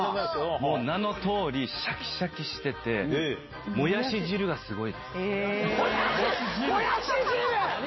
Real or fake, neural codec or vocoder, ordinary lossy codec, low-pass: real; none; MP3, 24 kbps; 7.2 kHz